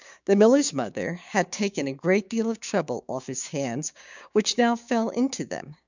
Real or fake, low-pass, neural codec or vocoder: fake; 7.2 kHz; codec, 16 kHz, 6 kbps, DAC